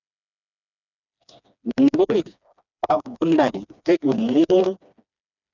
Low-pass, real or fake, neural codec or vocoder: 7.2 kHz; fake; codec, 16 kHz, 2 kbps, FreqCodec, smaller model